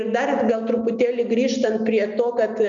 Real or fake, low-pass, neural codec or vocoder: real; 7.2 kHz; none